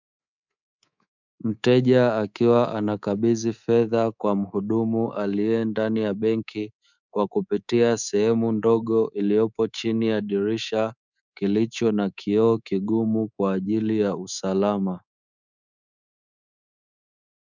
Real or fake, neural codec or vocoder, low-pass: fake; codec, 24 kHz, 3.1 kbps, DualCodec; 7.2 kHz